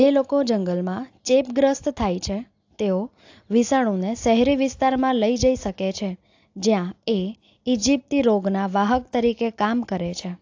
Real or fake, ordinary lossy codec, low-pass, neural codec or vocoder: real; AAC, 48 kbps; 7.2 kHz; none